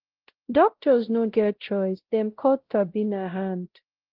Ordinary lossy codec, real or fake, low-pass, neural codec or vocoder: Opus, 16 kbps; fake; 5.4 kHz; codec, 16 kHz, 0.5 kbps, X-Codec, WavLM features, trained on Multilingual LibriSpeech